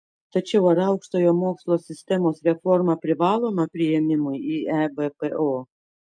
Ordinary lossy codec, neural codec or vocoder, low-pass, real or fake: MP3, 64 kbps; none; 9.9 kHz; real